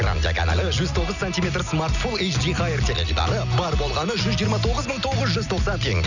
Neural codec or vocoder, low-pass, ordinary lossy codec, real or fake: none; 7.2 kHz; none; real